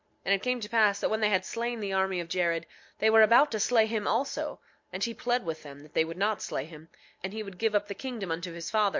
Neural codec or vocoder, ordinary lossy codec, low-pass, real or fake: none; MP3, 48 kbps; 7.2 kHz; real